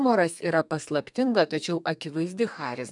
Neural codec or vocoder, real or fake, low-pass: codec, 32 kHz, 1.9 kbps, SNAC; fake; 10.8 kHz